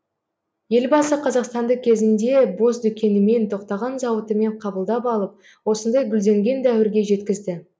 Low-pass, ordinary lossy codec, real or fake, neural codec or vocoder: none; none; real; none